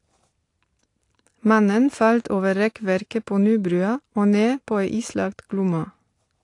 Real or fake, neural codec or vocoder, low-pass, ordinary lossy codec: real; none; 10.8 kHz; AAC, 48 kbps